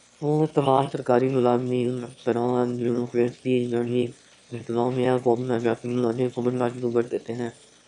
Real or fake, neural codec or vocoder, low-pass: fake; autoencoder, 22.05 kHz, a latent of 192 numbers a frame, VITS, trained on one speaker; 9.9 kHz